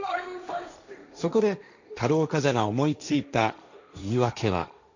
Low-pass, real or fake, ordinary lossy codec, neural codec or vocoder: 7.2 kHz; fake; none; codec, 16 kHz, 1.1 kbps, Voila-Tokenizer